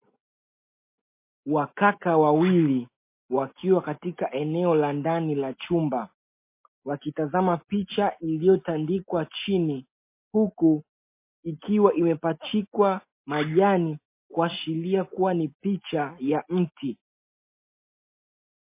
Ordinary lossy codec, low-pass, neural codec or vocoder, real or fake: MP3, 24 kbps; 3.6 kHz; none; real